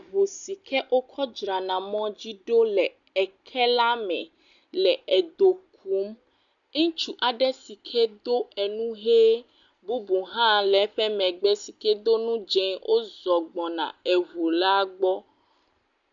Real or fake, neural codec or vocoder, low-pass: real; none; 7.2 kHz